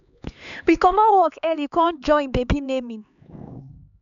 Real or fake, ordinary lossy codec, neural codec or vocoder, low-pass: fake; none; codec, 16 kHz, 4 kbps, X-Codec, HuBERT features, trained on LibriSpeech; 7.2 kHz